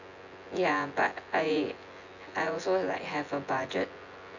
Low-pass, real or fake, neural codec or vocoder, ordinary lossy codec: 7.2 kHz; fake; vocoder, 24 kHz, 100 mel bands, Vocos; none